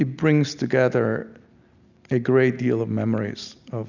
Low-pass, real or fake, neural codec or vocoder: 7.2 kHz; real; none